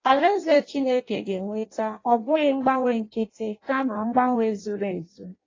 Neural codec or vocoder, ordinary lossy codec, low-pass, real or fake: codec, 16 kHz in and 24 kHz out, 0.6 kbps, FireRedTTS-2 codec; AAC, 32 kbps; 7.2 kHz; fake